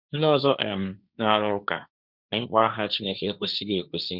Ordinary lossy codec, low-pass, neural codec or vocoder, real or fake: none; 5.4 kHz; codec, 16 kHz, 1.1 kbps, Voila-Tokenizer; fake